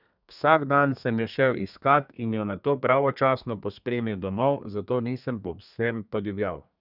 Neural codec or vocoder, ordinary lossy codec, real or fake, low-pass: codec, 32 kHz, 1.9 kbps, SNAC; none; fake; 5.4 kHz